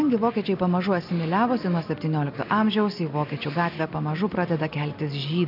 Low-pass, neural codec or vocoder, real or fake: 5.4 kHz; none; real